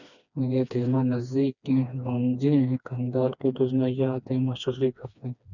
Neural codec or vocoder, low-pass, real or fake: codec, 16 kHz, 2 kbps, FreqCodec, smaller model; 7.2 kHz; fake